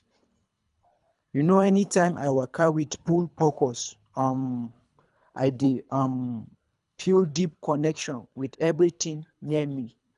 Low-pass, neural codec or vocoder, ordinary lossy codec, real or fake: 10.8 kHz; codec, 24 kHz, 3 kbps, HILCodec; none; fake